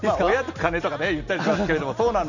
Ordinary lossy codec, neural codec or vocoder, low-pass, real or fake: none; none; 7.2 kHz; real